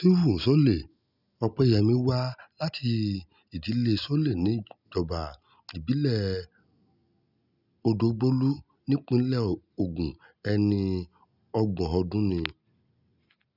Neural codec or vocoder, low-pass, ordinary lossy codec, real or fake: none; 5.4 kHz; none; real